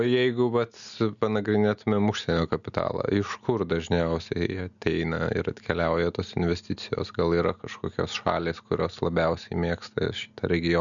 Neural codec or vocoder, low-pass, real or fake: none; 7.2 kHz; real